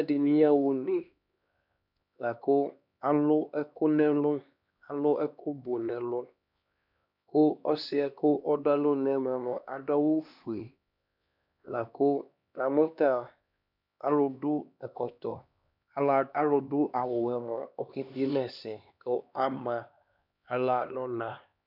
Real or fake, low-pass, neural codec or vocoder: fake; 5.4 kHz; codec, 16 kHz, 2 kbps, X-Codec, HuBERT features, trained on LibriSpeech